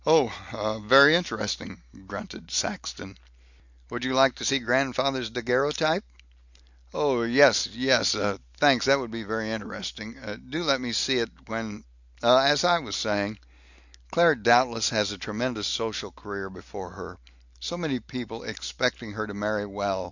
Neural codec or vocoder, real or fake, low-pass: none; real; 7.2 kHz